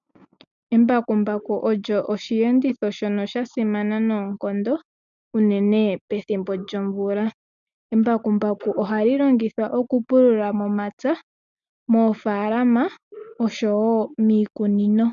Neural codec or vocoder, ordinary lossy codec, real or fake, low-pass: none; MP3, 96 kbps; real; 7.2 kHz